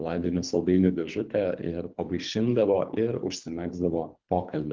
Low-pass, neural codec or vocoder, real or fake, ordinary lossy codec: 7.2 kHz; codec, 24 kHz, 3 kbps, HILCodec; fake; Opus, 32 kbps